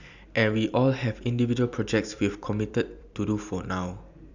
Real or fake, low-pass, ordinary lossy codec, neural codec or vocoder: fake; 7.2 kHz; none; vocoder, 44.1 kHz, 128 mel bands every 256 samples, BigVGAN v2